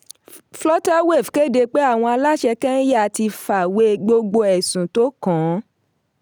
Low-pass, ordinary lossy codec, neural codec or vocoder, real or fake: none; none; none; real